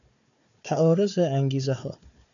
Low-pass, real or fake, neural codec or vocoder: 7.2 kHz; fake; codec, 16 kHz, 4 kbps, FunCodec, trained on Chinese and English, 50 frames a second